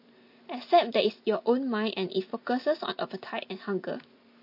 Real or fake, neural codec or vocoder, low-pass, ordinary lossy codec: real; none; 5.4 kHz; MP3, 32 kbps